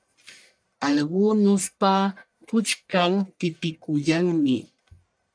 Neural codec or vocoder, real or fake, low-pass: codec, 44.1 kHz, 1.7 kbps, Pupu-Codec; fake; 9.9 kHz